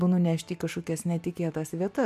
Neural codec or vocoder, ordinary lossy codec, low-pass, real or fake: none; MP3, 96 kbps; 14.4 kHz; real